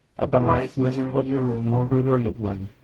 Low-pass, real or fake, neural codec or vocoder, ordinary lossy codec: 19.8 kHz; fake; codec, 44.1 kHz, 0.9 kbps, DAC; Opus, 16 kbps